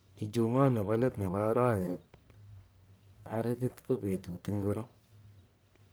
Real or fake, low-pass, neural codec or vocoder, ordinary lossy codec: fake; none; codec, 44.1 kHz, 1.7 kbps, Pupu-Codec; none